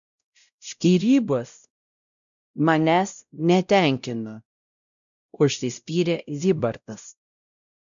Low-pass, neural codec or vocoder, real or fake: 7.2 kHz; codec, 16 kHz, 0.5 kbps, X-Codec, WavLM features, trained on Multilingual LibriSpeech; fake